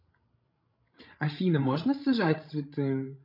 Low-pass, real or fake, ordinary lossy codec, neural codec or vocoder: 5.4 kHz; fake; MP3, 48 kbps; codec, 16 kHz, 16 kbps, FreqCodec, larger model